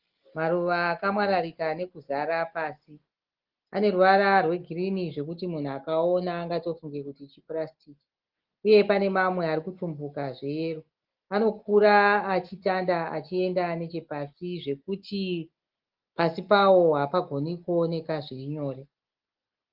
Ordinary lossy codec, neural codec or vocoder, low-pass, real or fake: Opus, 16 kbps; none; 5.4 kHz; real